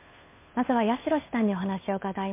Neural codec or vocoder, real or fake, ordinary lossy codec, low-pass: codec, 16 kHz, 2 kbps, FunCodec, trained on Chinese and English, 25 frames a second; fake; MP3, 24 kbps; 3.6 kHz